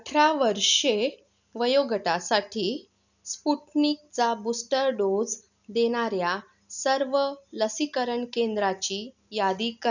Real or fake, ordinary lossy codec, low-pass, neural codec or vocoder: real; none; 7.2 kHz; none